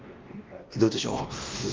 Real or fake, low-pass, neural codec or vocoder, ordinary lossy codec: fake; 7.2 kHz; codec, 16 kHz, 1 kbps, X-Codec, WavLM features, trained on Multilingual LibriSpeech; Opus, 24 kbps